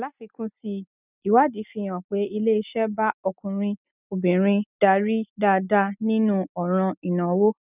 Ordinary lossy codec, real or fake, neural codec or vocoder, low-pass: none; real; none; 3.6 kHz